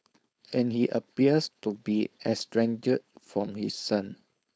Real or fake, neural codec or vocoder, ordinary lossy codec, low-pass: fake; codec, 16 kHz, 4.8 kbps, FACodec; none; none